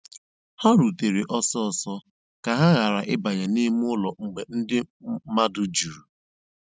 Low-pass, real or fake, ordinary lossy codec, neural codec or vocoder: none; real; none; none